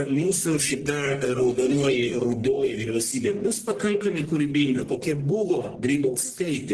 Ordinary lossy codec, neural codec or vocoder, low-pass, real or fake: Opus, 16 kbps; codec, 44.1 kHz, 1.7 kbps, Pupu-Codec; 10.8 kHz; fake